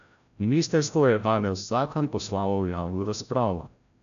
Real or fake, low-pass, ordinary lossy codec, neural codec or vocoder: fake; 7.2 kHz; none; codec, 16 kHz, 0.5 kbps, FreqCodec, larger model